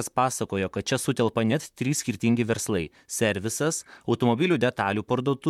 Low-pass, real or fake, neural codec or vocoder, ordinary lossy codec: 19.8 kHz; fake; vocoder, 44.1 kHz, 128 mel bands, Pupu-Vocoder; MP3, 96 kbps